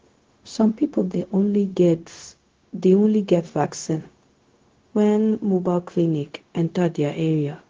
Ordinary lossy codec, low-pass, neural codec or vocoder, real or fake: Opus, 16 kbps; 7.2 kHz; codec, 16 kHz, 0.4 kbps, LongCat-Audio-Codec; fake